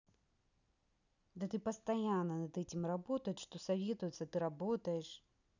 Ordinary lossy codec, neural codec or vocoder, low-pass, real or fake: none; none; 7.2 kHz; real